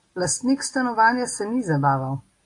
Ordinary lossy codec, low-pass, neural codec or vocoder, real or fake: AAC, 48 kbps; 10.8 kHz; none; real